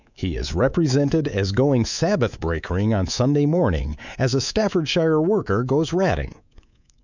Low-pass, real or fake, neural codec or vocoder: 7.2 kHz; fake; codec, 24 kHz, 3.1 kbps, DualCodec